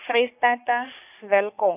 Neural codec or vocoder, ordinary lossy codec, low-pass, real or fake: codec, 44.1 kHz, 3.4 kbps, Pupu-Codec; none; 3.6 kHz; fake